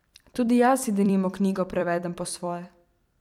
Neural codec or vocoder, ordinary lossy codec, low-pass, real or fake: vocoder, 44.1 kHz, 128 mel bands every 256 samples, BigVGAN v2; MP3, 96 kbps; 19.8 kHz; fake